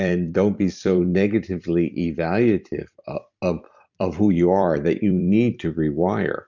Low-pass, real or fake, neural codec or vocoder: 7.2 kHz; fake; vocoder, 44.1 kHz, 128 mel bands every 256 samples, BigVGAN v2